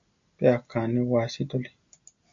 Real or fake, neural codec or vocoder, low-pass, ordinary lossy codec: real; none; 7.2 kHz; AAC, 64 kbps